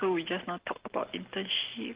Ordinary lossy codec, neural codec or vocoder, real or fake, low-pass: Opus, 16 kbps; none; real; 3.6 kHz